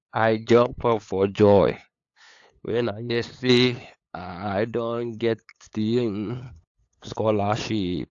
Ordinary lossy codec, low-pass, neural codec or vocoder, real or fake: AAC, 48 kbps; 7.2 kHz; codec, 16 kHz, 8 kbps, FunCodec, trained on LibriTTS, 25 frames a second; fake